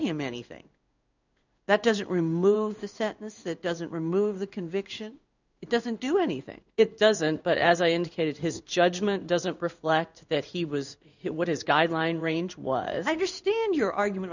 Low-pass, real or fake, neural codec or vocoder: 7.2 kHz; fake; codec, 16 kHz in and 24 kHz out, 1 kbps, XY-Tokenizer